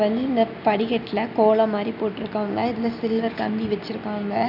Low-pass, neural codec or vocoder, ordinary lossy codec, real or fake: 5.4 kHz; none; none; real